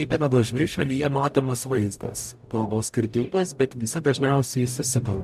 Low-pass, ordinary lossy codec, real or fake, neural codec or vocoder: 14.4 kHz; AAC, 96 kbps; fake; codec, 44.1 kHz, 0.9 kbps, DAC